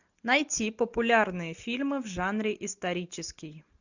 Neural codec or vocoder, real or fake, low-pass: none; real; 7.2 kHz